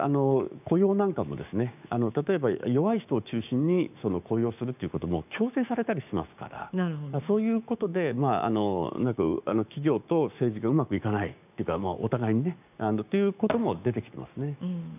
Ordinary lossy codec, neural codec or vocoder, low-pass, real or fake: none; codec, 16 kHz, 6 kbps, DAC; 3.6 kHz; fake